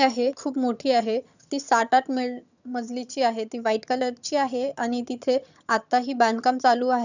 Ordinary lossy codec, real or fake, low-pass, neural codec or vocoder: none; fake; 7.2 kHz; vocoder, 22.05 kHz, 80 mel bands, HiFi-GAN